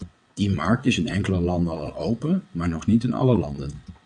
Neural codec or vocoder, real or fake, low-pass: vocoder, 22.05 kHz, 80 mel bands, WaveNeXt; fake; 9.9 kHz